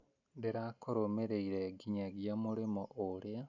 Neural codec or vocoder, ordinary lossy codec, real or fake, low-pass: none; none; real; 7.2 kHz